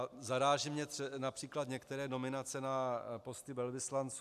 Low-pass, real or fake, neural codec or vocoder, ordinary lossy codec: 14.4 kHz; real; none; AAC, 96 kbps